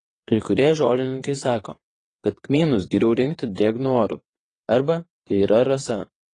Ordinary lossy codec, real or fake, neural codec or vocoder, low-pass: AAC, 32 kbps; fake; vocoder, 22.05 kHz, 80 mel bands, WaveNeXt; 9.9 kHz